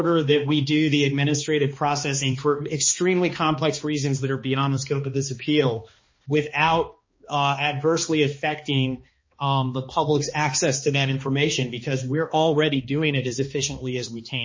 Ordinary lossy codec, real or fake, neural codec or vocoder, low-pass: MP3, 32 kbps; fake; codec, 16 kHz, 2 kbps, X-Codec, HuBERT features, trained on balanced general audio; 7.2 kHz